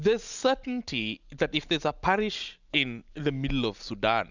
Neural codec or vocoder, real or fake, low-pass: none; real; 7.2 kHz